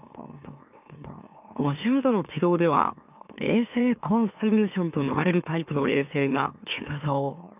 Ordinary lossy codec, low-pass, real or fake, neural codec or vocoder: none; 3.6 kHz; fake; autoencoder, 44.1 kHz, a latent of 192 numbers a frame, MeloTTS